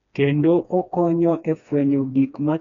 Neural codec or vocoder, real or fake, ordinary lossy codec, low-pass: codec, 16 kHz, 2 kbps, FreqCodec, smaller model; fake; none; 7.2 kHz